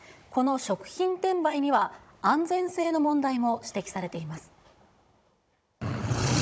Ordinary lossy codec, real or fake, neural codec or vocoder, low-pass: none; fake; codec, 16 kHz, 16 kbps, FunCodec, trained on Chinese and English, 50 frames a second; none